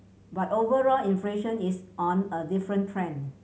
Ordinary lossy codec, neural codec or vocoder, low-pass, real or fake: none; none; none; real